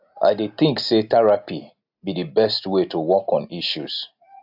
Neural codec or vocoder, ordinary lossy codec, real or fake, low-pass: none; AAC, 48 kbps; real; 5.4 kHz